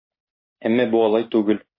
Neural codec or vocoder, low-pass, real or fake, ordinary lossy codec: none; 5.4 kHz; real; MP3, 24 kbps